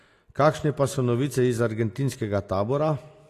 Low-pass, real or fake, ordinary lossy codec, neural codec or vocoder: 14.4 kHz; real; AAC, 48 kbps; none